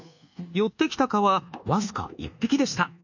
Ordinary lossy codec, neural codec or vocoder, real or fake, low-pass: none; codec, 24 kHz, 1.2 kbps, DualCodec; fake; 7.2 kHz